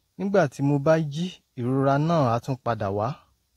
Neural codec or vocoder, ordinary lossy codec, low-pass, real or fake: none; AAC, 48 kbps; 19.8 kHz; real